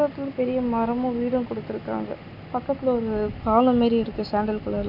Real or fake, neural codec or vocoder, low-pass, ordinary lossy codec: real; none; 5.4 kHz; none